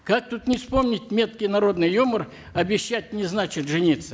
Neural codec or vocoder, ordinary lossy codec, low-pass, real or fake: none; none; none; real